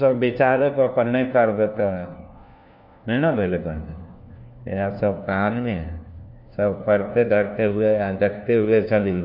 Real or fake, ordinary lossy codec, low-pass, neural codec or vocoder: fake; none; 5.4 kHz; codec, 16 kHz, 1 kbps, FunCodec, trained on LibriTTS, 50 frames a second